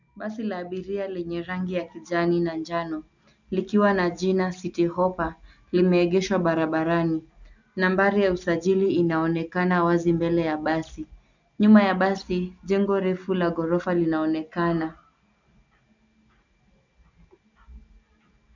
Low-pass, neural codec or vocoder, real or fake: 7.2 kHz; none; real